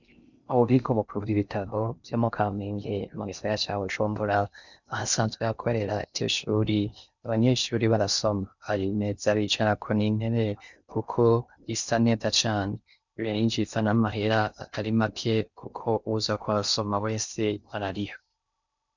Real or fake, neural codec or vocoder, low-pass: fake; codec, 16 kHz in and 24 kHz out, 0.6 kbps, FocalCodec, streaming, 4096 codes; 7.2 kHz